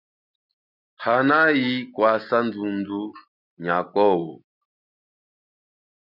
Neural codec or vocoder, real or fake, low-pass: none; real; 5.4 kHz